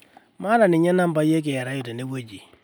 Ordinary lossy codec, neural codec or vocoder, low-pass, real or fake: none; none; none; real